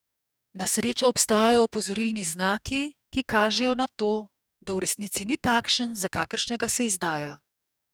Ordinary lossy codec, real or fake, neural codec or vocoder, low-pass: none; fake; codec, 44.1 kHz, 2.6 kbps, DAC; none